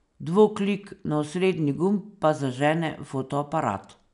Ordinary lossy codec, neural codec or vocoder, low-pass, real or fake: none; none; 10.8 kHz; real